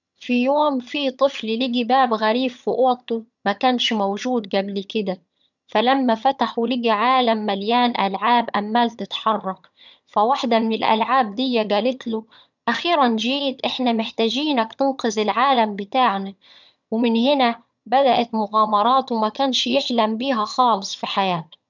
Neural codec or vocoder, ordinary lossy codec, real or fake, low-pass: vocoder, 22.05 kHz, 80 mel bands, HiFi-GAN; none; fake; 7.2 kHz